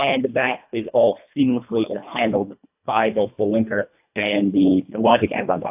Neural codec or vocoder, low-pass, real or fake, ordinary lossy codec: codec, 24 kHz, 1.5 kbps, HILCodec; 3.6 kHz; fake; AAC, 32 kbps